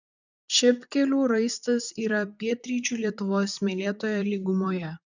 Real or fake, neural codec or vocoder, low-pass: fake; vocoder, 44.1 kHz, 128 mel bands, Pupu-Vocoder; 7.2 kHz